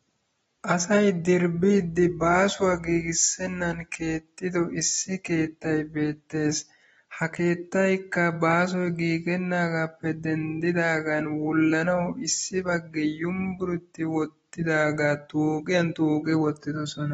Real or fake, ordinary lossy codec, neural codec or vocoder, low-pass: real; AAC, 24 kbps; none; 19.8 kHz